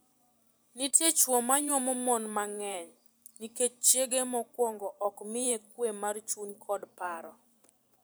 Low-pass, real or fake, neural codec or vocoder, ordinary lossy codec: none; fake; vocoder, 44.1 kHz, 128 mel bands every 512 samples, BigVGAN v2; none